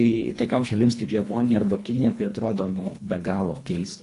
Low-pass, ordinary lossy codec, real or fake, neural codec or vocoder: 10.8 kHz; AAC, 48 kbps; fake; codec, 24 kHz, 1.5 kbps, HILCodec